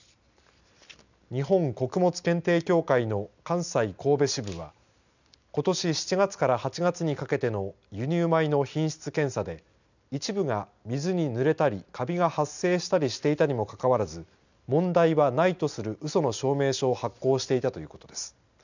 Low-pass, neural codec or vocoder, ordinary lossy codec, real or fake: 7.2 kHz; none; none; real